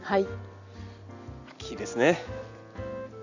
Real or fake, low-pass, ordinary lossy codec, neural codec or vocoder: real; 7.2 kHz; none; none